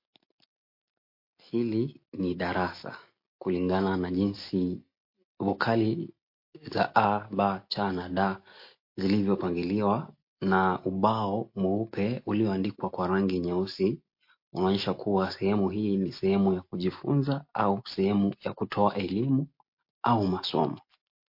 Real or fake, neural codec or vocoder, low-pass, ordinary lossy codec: real; none; 5.4 kHz; MP3, 32 kbps